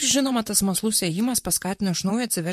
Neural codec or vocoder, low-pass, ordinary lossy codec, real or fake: vocoder, 44.1 kHz, 128 mel bands, Pupu-Vocoder; 14.4 kHz; MP3, 64 kbps; fake